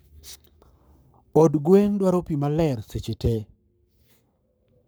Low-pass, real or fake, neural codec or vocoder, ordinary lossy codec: none; fake; codec, 44.1 kHz, 7.8 kbps, Pupu-Codec; none